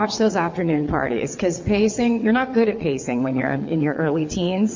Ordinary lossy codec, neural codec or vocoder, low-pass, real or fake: MP3, 64 kbps; vocoder, 22.05 kHz, 80 mel bands, WaveNeXt; 7.2 kHz; fake